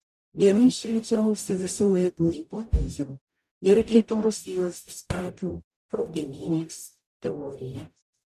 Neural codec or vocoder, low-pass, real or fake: codec, 44.1 kHz, 0.9 kbps, DAC; 14.4 kHz; fake